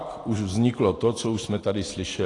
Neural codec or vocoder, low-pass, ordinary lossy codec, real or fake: none; 10.8 kHz; AAC, 32 kbps; real